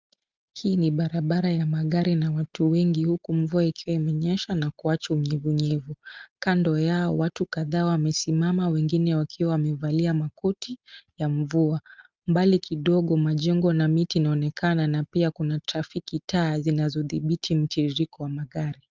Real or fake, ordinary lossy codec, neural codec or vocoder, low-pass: real; Opus, 32 kbps; none; 7.2 kHz